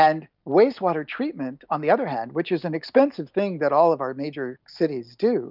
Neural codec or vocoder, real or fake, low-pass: none; real; 5.4 kHz